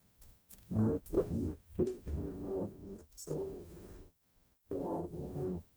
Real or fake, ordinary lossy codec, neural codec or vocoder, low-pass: fake; none; codec, 44.1 kHz, 0.9 kbps, DAC; none